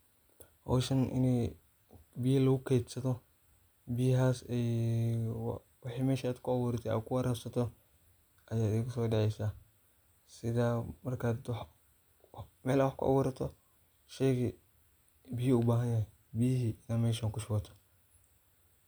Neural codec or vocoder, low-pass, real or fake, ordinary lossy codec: none; none; real; none